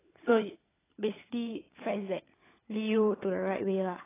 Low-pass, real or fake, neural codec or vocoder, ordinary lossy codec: 3.6 kHz; fake; codec, 16 kHz, 16 kbps, FunCodec, trained on LibriTTS, 50 frames a second; AAC, 16 kbps